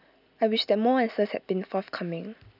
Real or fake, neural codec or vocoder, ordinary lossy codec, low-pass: real; none; none; 5.4 kHz